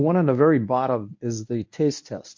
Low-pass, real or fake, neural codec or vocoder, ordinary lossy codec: 7.2 kHz; fake; codec, 24 kHz, 0.9 kbps, WavTokenizer, medium speech release version 1; MP3, 48 kbps